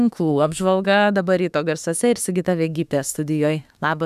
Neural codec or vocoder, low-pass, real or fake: autoencoder, 48 kHz, 32 numbers a frame, DAC-VAE, trained on Japanese speech; 14.4 kHz; fake